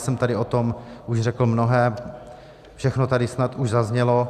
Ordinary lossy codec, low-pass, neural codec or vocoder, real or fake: Opus, 64 kbps; 14.4 kHz; none; real